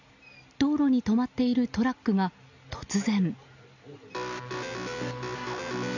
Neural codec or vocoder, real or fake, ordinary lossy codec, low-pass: none; real; none; 7.2 kHz